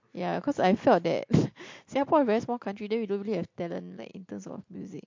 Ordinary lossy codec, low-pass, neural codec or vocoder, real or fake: MP3, 48 kbps; 7.2 kHz; none; real